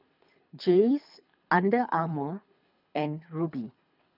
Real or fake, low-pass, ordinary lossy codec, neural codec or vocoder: fake; 5.4 kHz; none; codec, 24 kHz, 3 kbps, HILCodec